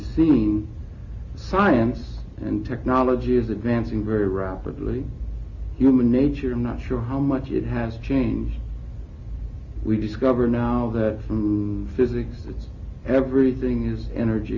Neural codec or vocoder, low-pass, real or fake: none; 7.2 kHz; real